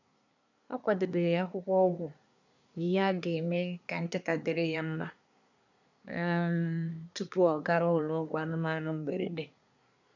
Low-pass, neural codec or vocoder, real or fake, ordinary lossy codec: 7.2 kHz; codec, 24 kHz, 1 kbps, SNAC; fake; none